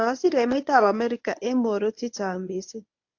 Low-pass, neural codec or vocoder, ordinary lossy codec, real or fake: 7.2 kHz; codec, 24 kHz, 0.9 kbps, WavTokenizer, medium speech release version 1; AAC, 48 kbps; fake